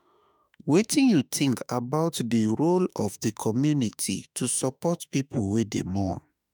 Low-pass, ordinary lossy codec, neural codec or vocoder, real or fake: none; none; autoencoder, 48 kHz, 32 numbers a frame, DAC-VAE, trained on Japanese speech; fake